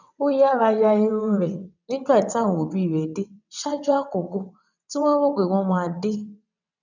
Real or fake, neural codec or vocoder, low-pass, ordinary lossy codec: fake; vocoder, 22.05 kHz, 80 mel bands, WaveNeXt; 7.2 kHz; none